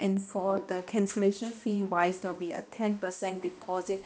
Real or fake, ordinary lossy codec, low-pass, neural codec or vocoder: fake; none; none; codec, 16 kHz, 1 kbps, X-Codec, HuBERT features, trained on balanced general audio